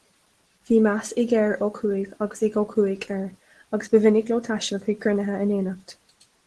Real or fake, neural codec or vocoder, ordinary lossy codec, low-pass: real; none; Opus, 16 kbps; 10.8 kHz